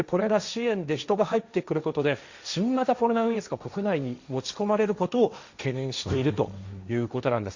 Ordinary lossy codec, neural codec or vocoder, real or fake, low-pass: Opus, 64 kbps; codec, 16 kHz, 1.1 kbps, Voila-Tokenizer; fake; 7.2 kHz